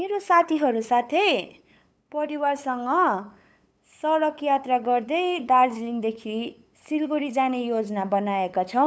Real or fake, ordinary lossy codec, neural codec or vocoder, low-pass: fake; none; codec, 16 kHz, 8 kbps, FunCodec, trained on LibriTTS, 25 frames a second; none